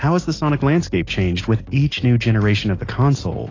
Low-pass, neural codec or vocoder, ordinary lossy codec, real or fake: 7.2 kHz; none; AAC, 32 kbps; real